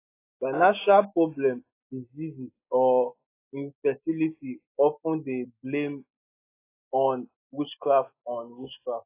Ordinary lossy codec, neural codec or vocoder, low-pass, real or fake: AAC, 24 kbps; none; 3.6 kHz; real